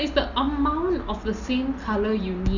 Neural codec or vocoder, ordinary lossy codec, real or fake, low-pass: none; none; real; 7.2 kHz